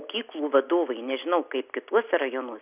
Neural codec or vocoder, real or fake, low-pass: none; real; 3.6 kHz